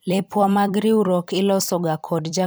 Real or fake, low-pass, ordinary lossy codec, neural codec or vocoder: fake; none; none; vocoder, 44.1 kHz, 128 mel bands every 256 samples, BigVGAN v2